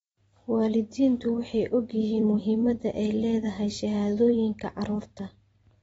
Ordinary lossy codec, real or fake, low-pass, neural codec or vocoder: AAC, 24 kbps; fake; 19.8 kHz; vocoder, 44.1 kHz, 128 mel bands every 256 samples, BigVGAN v2